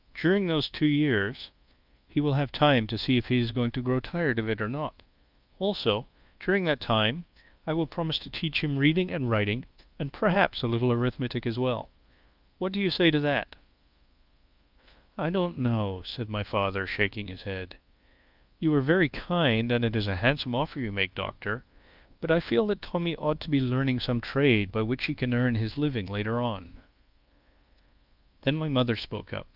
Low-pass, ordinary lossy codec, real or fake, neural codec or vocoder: 5.4 kHz; Opus, 24 kbps; fake; codec, 24 kHz, 1.2 kbps, DualCodec